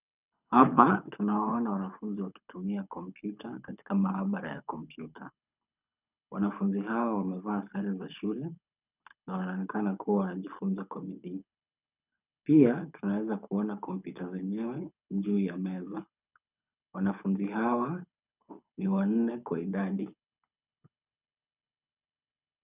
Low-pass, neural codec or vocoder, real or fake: 3.6 kHz; codec, 24 kHz, 6 kbps, HILCodec; fake